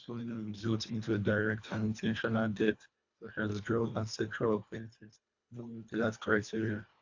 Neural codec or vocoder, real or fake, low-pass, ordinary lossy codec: codec, 24 kHz, 1.5 kbps, HILCodec; fake; 7.2 kHz; AAC, 48 kbps